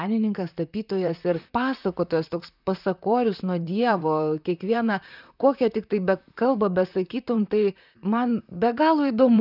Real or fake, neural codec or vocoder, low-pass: fake; vocoder, 44.1 kHz, 128 mel bands, Pupu-Vocoder; 5.4 kHz